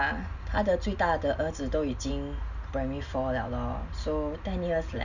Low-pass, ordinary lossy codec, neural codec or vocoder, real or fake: 7.2 kHz; none; none; real